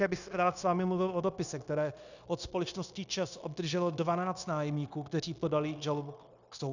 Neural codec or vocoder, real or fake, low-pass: codec, 16 kHz, 0.9 kbps, LongCat-Audio-Codec; fake; 7.2 kHz